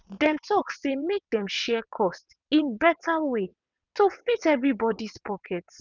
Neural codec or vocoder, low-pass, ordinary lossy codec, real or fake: vocoder, 22.05 kHz, 80 mel bands, WaveNeXt; 7.2 kHz; none; fake